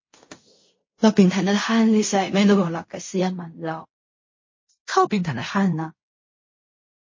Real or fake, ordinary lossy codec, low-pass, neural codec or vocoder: fake; MP3, 32 kbps; 7.2 kHz; codec, 16 kHz in and 24 kHz out, 0.4 kbps, LongCat-Audio-Codec, fine tuned four codebook decoder